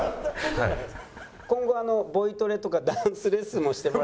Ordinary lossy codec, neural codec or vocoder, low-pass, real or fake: none; none; none; real